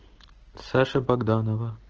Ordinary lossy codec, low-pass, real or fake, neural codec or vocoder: Opus, 16 kbps; 7.2 kHz; real; none